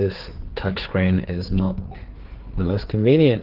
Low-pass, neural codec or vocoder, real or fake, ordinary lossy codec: 5.4 kHz; codec, 16 kHz, 4 kbps, FreqCodec, larger model; fake; Opus, 16 kbps